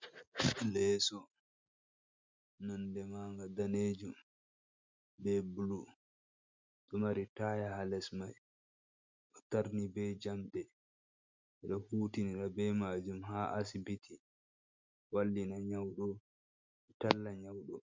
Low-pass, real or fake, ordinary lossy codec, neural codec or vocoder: 7.2 kHz; real; MP3, 64 kbps; none